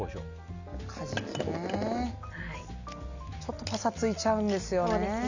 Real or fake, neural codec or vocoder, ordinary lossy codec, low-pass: real; none; Opus, 64 kbps; 7.2 kHz